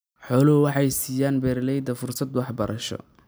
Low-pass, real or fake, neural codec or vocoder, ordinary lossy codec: none; real; none; none